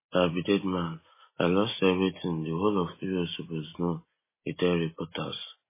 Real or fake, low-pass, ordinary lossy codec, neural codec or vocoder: real; 3.6 kHz; MP3, 16 kbps; none